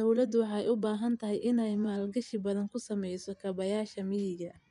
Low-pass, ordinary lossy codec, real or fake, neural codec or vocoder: 10.8 kHz; MP3, 96 kbps; fake; vocoder, 24 kHz, 100 mel bands, Vocos